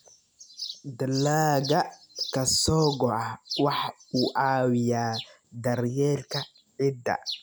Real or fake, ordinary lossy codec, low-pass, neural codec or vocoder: real; none; none; none